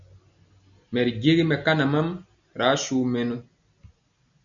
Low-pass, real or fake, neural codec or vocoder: 7.2 kHz; real; none